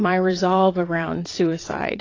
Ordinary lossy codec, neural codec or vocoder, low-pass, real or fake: AAC, 32 kbps; vocoder, 22.05 kHz, 80 mel bands, WaveNeXt; 7.2 kHz; fake